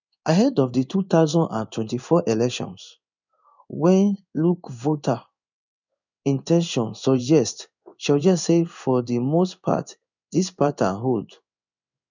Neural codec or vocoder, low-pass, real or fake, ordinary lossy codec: codec, 16 kHz in and 24 kHz out, 1 kbps, XY-Tokenizer; 7.2 kHz; fake; none